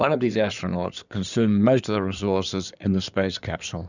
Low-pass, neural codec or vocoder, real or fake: 7.2 kHz; codec, 16 kHz in and 24 kHz out, 2.2 kbps, FireRedTTS-2 codec; fake